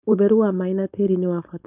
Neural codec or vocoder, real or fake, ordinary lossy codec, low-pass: codec, 16 kHz, 4.8 kbps, FACodec; fake; none; 3.6 kHz